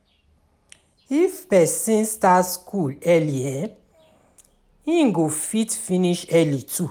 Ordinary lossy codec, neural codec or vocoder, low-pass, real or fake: none; none; none; real